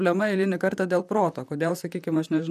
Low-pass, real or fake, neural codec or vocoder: 14.4 kHz; fake; vocoder, 44.1 kHz, 128 mel bands, Pupu-Vocoder